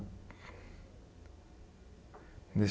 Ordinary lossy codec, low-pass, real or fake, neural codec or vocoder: none; none; real; none